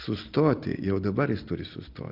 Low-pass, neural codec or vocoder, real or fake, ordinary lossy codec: 5.4 kHz; none; real; Opus, 32 kbps